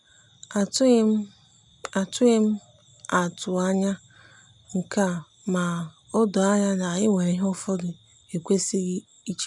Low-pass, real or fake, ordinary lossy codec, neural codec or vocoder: 10.8 kHz; real; none; none